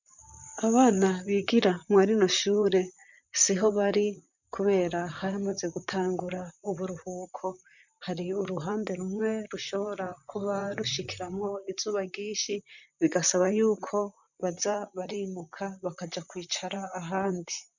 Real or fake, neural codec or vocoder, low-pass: fake; vocoder, 44.1 kHz, 128 mel bands, Pupu-Vocoder; 7.2 kHz